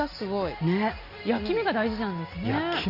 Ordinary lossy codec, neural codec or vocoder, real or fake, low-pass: Opus, 64 kbps; none; real; 5.4 kHz